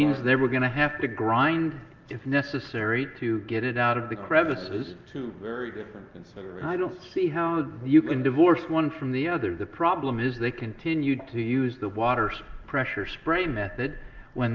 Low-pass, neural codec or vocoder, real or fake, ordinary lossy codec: 7.2 kHz; none; real; Opus, 24 kbps